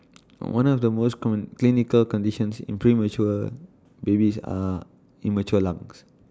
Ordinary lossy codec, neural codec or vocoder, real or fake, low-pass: none; none; real; none